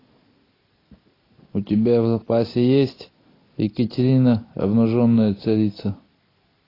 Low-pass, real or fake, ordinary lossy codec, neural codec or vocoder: 5.4 kHz; real; AAC, 24 kbps; none